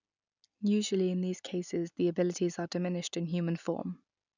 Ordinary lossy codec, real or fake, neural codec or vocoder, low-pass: none; real; none; 7.2 kHz